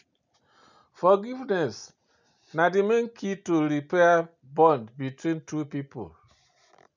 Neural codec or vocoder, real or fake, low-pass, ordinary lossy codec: none; real; 7.2 kHz; none